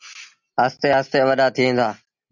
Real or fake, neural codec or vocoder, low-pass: real; none; 7.2 kHz